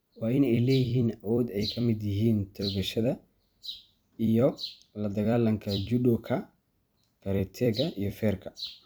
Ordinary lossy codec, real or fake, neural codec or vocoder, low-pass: none; fake; vocoder, 44.1 kHz, 128 mel bands every 256 samples, BigVGAN v2; none